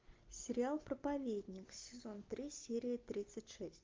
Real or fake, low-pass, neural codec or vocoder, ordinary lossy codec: real; 7.2 kHz; none; Opus, 24 kbps